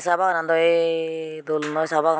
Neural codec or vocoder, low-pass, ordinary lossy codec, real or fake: none; none; none; real